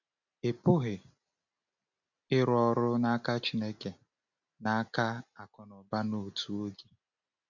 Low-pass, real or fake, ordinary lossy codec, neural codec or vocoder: 7.2 kHz; real; none; none